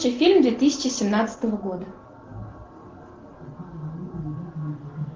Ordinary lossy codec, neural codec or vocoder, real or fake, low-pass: Opus, 16 kbps; none; real; 7.2 kHz